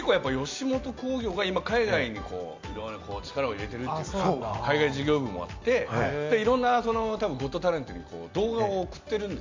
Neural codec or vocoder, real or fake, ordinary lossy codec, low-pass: none; real; MP3, 64 kbps; 7.2 kHz